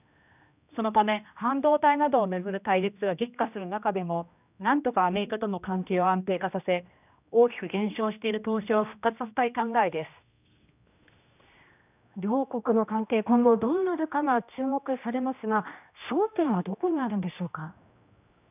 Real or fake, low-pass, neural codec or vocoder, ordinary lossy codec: fake; 3.6 kHz; codec, 16 kHz, 1 kbps, X-Codec, HuBERT features, trained on general audio; none